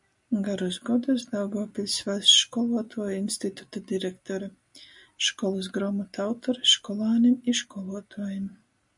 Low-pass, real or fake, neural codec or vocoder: 10.8 kHz; real; none